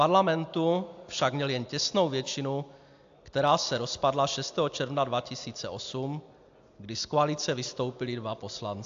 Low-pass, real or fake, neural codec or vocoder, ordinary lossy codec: 7.2 kHz; real; none; AAC, 64 kbps